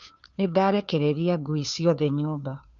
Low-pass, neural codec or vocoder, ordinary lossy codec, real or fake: 7.2 kHz; codec, 16 kHz, 2 kbps, FunCodec, trained on Chinese and English, 25 frames a second; none; fake